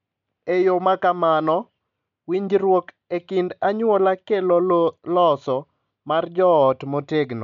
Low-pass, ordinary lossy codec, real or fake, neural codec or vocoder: 7.2 kHz; none; real; none